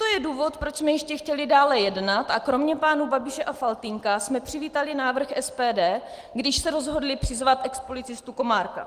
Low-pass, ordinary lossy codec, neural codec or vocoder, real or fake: 14.4 kHz; Opus, 24 kbps; none; real